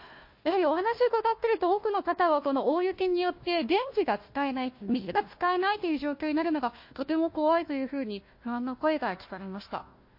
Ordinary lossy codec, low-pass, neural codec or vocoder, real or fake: MP3, 32 kbps; 5.4 kHz; codec, 16 kHz, 1 kbps, FunCodec, trained on Chinese and English, 50 frames a second; fake